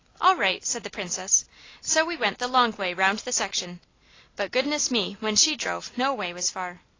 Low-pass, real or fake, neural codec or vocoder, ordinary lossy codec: 7.2 kHz; real; none; AAC, 32 kbps